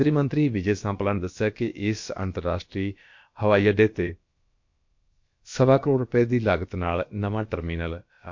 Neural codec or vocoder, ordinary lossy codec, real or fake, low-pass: codec, 16 kHz, about 1 kbps, DyCAST, with the encoder's durations; MP3, 48 kbps; fake; 7.2 kHz